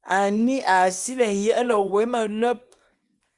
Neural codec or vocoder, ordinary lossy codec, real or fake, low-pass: codec, 24 kHz, 0.9 kbps, WavTokenizer, small release; Opus, 64 kbps; fake; 10.8 kHz